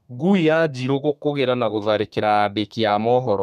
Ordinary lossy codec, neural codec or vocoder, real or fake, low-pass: none; codec, 32 kHz, 1.9 kbps, SNAC; fake; 14.4 kHz